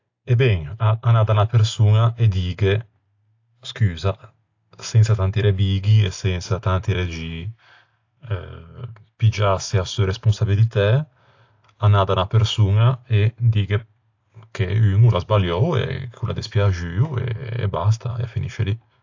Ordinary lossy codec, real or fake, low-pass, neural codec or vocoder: AAC, 48 kbps; real; 7.2 kHz; none